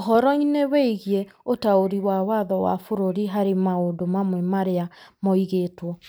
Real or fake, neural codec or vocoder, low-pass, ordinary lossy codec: real; none; none; none